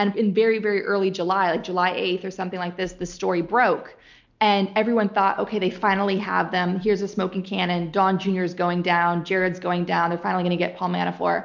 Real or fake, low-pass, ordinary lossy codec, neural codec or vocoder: real; 7.2 kHz; MP3, 64 kbps; none